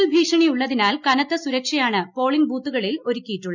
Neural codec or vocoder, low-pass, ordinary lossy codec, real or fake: none; 7.2 kHz; none; real